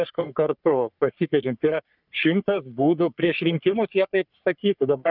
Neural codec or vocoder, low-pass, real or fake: codec, 44.1 kHz, 3.4 kbps, Pupu-Codec; 5.4 kHz; fake